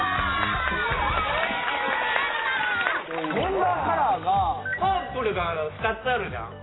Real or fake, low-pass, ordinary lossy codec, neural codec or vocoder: real; 7.2 kHz; AAC, 16 kbps; none